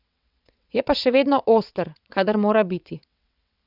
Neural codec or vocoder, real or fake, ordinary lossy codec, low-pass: vocoder, 22.05 kHz, 80 mel bands, WaveNeXt; fake; none; 5.4 kHz